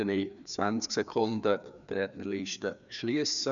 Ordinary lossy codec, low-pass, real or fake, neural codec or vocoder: none; 7.2 kHz; fake; codec, 16 kHz, 2 kbps, FreqCodec, larger model